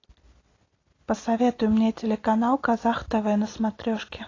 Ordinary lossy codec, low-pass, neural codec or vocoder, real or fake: AAC, 32 kbps; 7.2 kHz; none; real